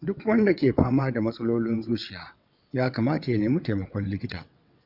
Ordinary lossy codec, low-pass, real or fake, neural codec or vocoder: none; 5.4 kHz; fake; vocoder, 22.05 kHz, 80 mel bands, WaveNeXt